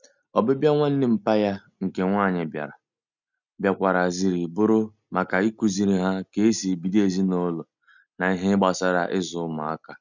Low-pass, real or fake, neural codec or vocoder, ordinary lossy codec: 7.2 kHz; real; none; none